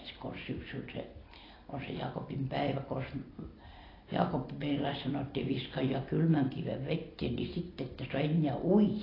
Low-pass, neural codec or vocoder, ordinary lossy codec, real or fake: 5.4 kHz; none; AAC, 24 kbps; real